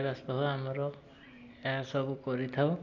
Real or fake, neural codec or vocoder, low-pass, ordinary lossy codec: real; none; 7.2 kHz; none